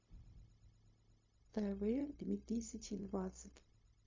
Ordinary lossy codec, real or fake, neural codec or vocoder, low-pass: MP3, 32 kbps; fake; codec, 16 kHz, 0.4 kbps, LongCat-Audio-Codec; 7.2 kHz